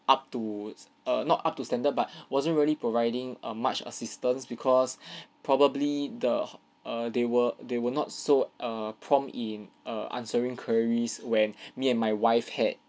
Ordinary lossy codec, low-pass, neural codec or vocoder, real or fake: none; none; none; real